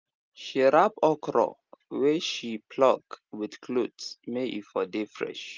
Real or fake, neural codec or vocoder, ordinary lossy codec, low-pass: real; none; Opus, 32 kbps; 7.2 kHz